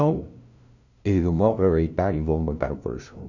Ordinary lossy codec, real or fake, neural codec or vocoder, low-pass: none; fake; codec, 16 kHz, 0.5 kbps, FunCodec, trained on LibriTTS, 25 frames a second; 7.2 kHz